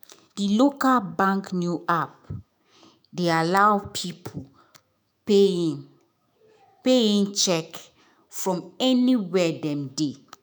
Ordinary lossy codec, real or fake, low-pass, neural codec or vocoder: none; fake; none; autoencoder, 48 kHz, 128 numbers a frame, DAC-VAE, trained on Japanese speech